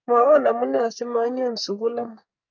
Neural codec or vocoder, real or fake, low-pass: codec, 44.1 kHz, 2.6 kbps, SNAC; fake; 7.2 kHz